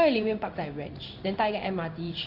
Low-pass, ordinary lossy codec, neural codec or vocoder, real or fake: 5.4 kHz; none; codec, 16 kHz in and 24 kHz out, 1 kbps, XY-Tokenizer; fake